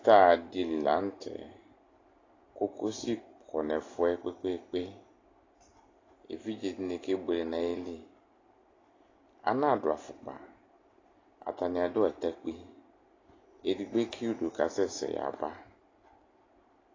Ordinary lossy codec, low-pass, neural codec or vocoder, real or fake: AAC, 32 kbps; 7.2 kHz; none; real